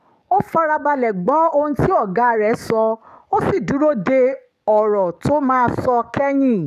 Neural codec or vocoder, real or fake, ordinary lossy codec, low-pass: codec, 44.1 kHz, 7.8 kbps, DAC; fake; none; 14.4 kHz